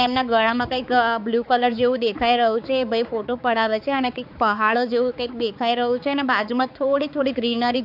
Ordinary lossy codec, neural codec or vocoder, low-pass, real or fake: AAC, 48 kbps; codec, 16 kHz, 4 kbps, X-Codec, HuBERT features, trained on balanced general audio; 5.4 kHz; fake